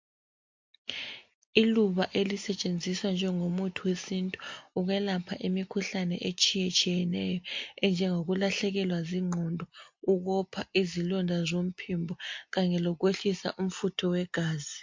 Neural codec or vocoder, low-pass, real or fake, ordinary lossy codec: none; 7.2 kHz; real; MP3, 48 kbps